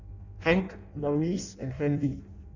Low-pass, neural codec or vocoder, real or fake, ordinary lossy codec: 7.2 kHz; codec, 16 kHz in and 24 kHz out, 0.6 kbps, FireRedTTS-2 codec; fake; none